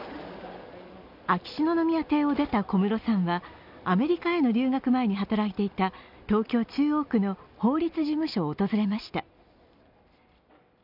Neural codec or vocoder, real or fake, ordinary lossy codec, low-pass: none; real; none; 5.4 kHz